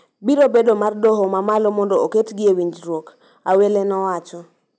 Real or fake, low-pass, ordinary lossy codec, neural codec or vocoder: real; none; none; none